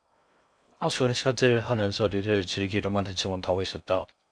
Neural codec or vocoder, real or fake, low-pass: codec, 16 kHz in and 24 kHz out, 0.6 kbps, FocalCodec, streaming, 2048 codes; fake; 9.9 kHz